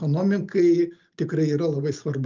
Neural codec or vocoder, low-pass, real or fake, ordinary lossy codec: none; 7.2 kHz; real; Opus, 24 kbps